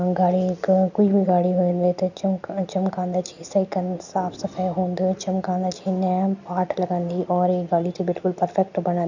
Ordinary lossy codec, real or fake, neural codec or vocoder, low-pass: none; real; none; 7.2 kHz